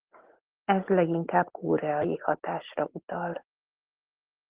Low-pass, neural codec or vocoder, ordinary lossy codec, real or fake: 3.6 kHz; none; Opus, 16 kbps; real